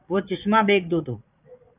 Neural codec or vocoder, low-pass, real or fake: vocoder, 22.05 kHz, 80 mel bands, Vocos; 3.6 kHz; fake